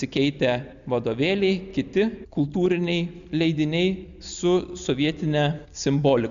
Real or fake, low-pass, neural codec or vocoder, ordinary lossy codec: real; 7.2 kHz; none; MP3, 96 kbps